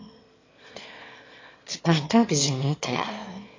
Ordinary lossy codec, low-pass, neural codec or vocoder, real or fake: AAC, 32 kbps; 7.2 kHz; autoencoder, 22.05 kHz, a latent of 192 numbers a frame, VITS, trained on one speaker; fake